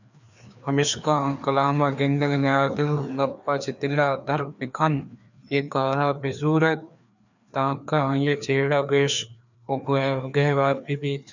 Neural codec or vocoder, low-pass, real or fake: codec, 16 kHz, 2 kbps, FreqCodec, larger model; 7.2 kHz; fake